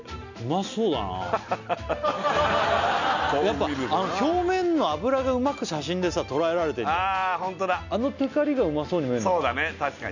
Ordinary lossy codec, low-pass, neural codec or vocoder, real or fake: none; 7.2 kHz; none; real